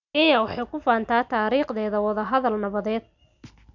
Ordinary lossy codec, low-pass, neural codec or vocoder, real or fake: none; 7.2 kHz; none; real